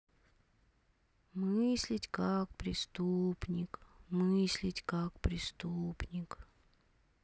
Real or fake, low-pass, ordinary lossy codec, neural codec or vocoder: real; none; none; none